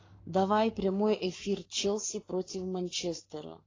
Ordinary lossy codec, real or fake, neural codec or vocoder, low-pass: AAC, 32 kbps; fake; codec, 44.1 kHz, 7.8 kbps, Pupu-Codec; 7.2 kHz